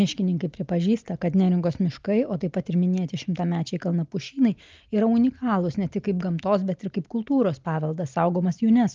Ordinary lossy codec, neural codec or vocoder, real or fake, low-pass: Opus, 24 kbps; none; real; 7.2 kHz